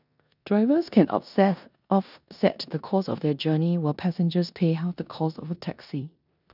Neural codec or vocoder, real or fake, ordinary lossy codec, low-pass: codec, 16 kHz in and 24 kHz out, 0.9 kbps, LongCat-Audio-Codec, four codebook decoder; fake; none; 5.4 kHz